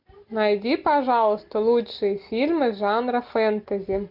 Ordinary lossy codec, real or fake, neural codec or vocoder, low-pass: MP3, 48 kbps; real; none; 5.4 kHz